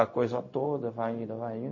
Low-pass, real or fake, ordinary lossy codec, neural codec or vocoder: 7.2 kHz; fake; MP3, 32 kbps; codec, 16 kHz, 0.4 kbps, LongCat-Audio-Codec